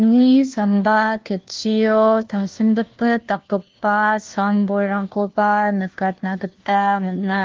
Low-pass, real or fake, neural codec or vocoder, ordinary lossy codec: 7.2 kHz; fake; codec, 16 kHz, 1 kbps, FunCodec, trained on LibriTTS, 50 frames a second; Opus, 16 kbps